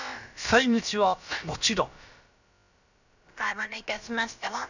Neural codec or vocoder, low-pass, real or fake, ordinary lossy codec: codec, 16 kHz, about 1 kbps, DyCAST, with the encoder's durations; 7.2 kHz; fake; none